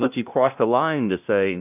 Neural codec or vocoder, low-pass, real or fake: codec, 16 kHz, 0.5 kbps, FunCodec, trained on LibriTTS, 25 frames a second; 3.6 kHz; fake